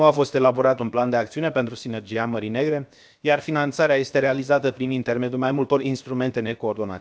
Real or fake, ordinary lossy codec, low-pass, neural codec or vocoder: fake; none; none; codec, 16 kHz, 0.7 kbps, FocalCodec